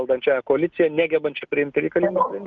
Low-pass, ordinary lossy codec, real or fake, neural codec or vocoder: 7.2 kHz; Opus, 24 kbps; real; none